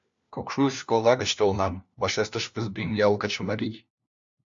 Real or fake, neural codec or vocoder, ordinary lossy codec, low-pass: fake; codec, 16 kHz, 1 kbps, FunCodec, trained on LibriTTS, 50 frames a second; AAC, 64 kbps; 7.2 kHz